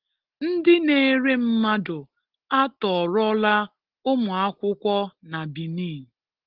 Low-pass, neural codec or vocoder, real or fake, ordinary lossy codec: 5.4 kHz; none; real; Opus, 16 kbps